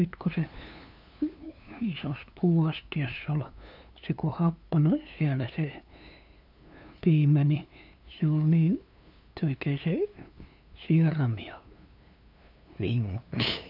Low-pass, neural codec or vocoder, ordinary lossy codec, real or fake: 5.4 kHz; codec, 16 kHz, 2 kbps, FunCodec, trained on LibriTTS, 25 frames a second; none; fake